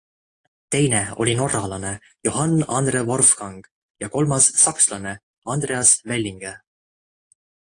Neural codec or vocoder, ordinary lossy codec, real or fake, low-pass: none; AAC, 48 kbps; real; 9.9 kHz